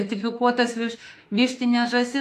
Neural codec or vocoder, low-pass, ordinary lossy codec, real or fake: autoencoder, 48 kHz, 32 numbers a frame, DAC-VAE, trained on Japanese speech; 14.4 kHz; AAC, 64 kbps; fake